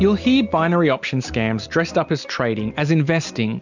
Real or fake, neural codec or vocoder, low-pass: real; none; 7.2 kHz